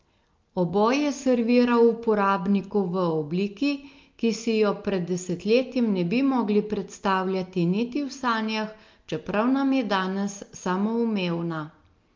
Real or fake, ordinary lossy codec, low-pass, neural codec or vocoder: real; Opus, 32 kbps; 7.2 kHz; none